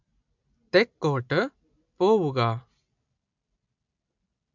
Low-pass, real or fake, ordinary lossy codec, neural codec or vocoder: 7.2 kHz; real; MP3, 64 kbps; none